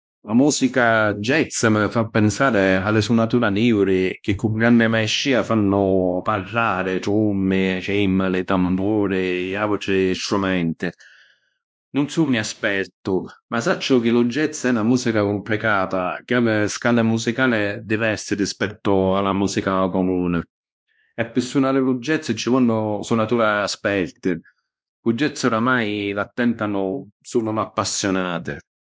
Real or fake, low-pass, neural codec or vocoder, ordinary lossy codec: fake; none; codec, 16 kHz, 1 kbps, X-Codec, WavLM features, trained on Multilingual LibriSpeech; none